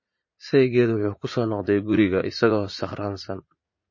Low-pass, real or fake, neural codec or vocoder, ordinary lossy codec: 7.2 kHz; fake; vocoder, 44.1 kHz, 80 mel bands, Vocos; MP3, 32 kbps